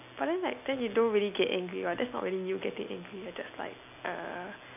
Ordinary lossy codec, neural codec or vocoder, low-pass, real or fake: none; none; 3.6 kHz; real